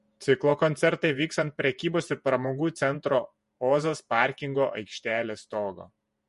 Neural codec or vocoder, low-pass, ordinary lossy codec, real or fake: vocoder, 48 kHz, 128 mel bands, Vocos; 14.4 kHz; MP3, 48 kbps; fake